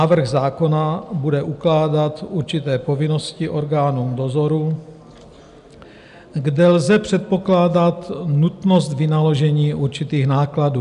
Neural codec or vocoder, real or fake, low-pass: none; real; 10.8 kHz